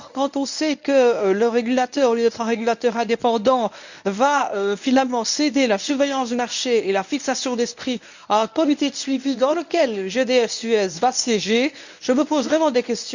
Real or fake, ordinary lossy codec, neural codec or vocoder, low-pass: fake; none; codec, 24 kHz, 0.9 kbps, WavTokenizer, medium speech release version 1; 7.2 kHz